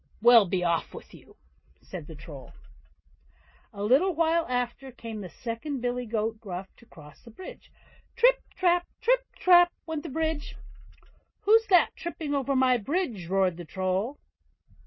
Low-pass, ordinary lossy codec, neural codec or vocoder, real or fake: 7.2 kHz; MP3, 24 kbps; none; real